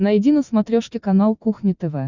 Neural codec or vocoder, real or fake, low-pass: none; real; 7.2 kHz